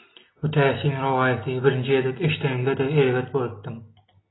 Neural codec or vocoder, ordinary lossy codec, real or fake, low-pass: none; AAC, 16 kbps; real; 7.2 kHz